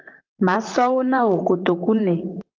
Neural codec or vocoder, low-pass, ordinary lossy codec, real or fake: vocoder, 44.1 kHz, 128 mel bands, Pupu-Vocoder; 7.2 kHz; Opus, 32 kbps; fake